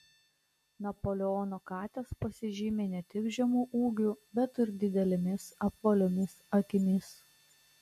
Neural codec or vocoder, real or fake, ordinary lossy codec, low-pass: none; real; MP3, 64 kbps; 14.4 kHz